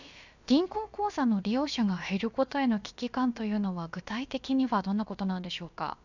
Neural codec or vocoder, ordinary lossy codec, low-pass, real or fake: codec, 16 kHz, about 1 kbps, DyCAST, with the encoder's durations; none; 7.2 kHz; fake